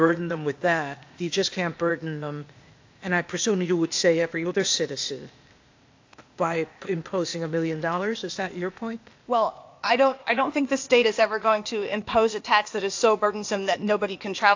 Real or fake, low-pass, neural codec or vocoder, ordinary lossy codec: fake; 7.2 kHz; codec, 16 kHz, 0.8 kbps, ZipCodec; AAC, 48 kbps